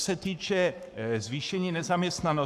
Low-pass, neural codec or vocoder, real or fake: 14.4 kHz; codec, 44.1 kHz, 7.8 kbps, Pupu-Codec; fake